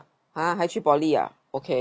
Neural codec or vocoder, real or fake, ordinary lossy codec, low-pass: none; real; none; none